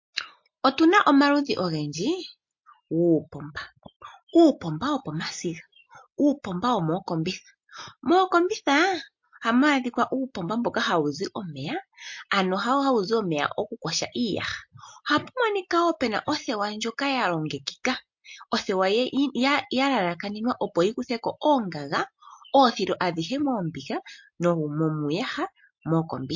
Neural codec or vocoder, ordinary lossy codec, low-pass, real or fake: none; MP3, 48 kbps; 7.2 kHz; real